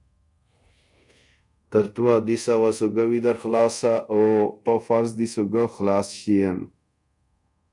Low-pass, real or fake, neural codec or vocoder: 10.8 kHz; fake; codec, 24 kHz, 0.5 kbps, DualCodec